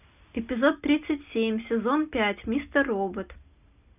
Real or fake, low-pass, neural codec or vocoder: real; 3.6 kHz; none